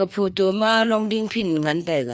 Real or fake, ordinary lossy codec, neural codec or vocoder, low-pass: fake; none; codec, 16 kHz, 2 kbps, FreqCodec, larger model; none